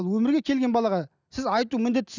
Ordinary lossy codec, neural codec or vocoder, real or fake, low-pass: none; none; real; 7.2 kHz